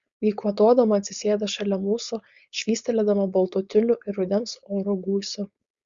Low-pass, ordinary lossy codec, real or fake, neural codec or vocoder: 7.2 kHz; Opus, 64 kbps; fake; codec, 16 kHz, 4.8 kbps, FACodec